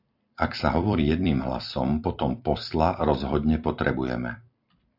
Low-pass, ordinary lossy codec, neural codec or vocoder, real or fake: 5.4 kHz; AAC, 48 kbps; vocoder, 44.1 kHz, 128 mel bands every 256 samples, BigVGAN v2; fake